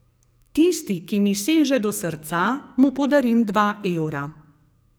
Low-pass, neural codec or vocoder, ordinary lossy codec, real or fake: none; codec, 44.1 kHz, 2.6 kbps, SNAC; none; fake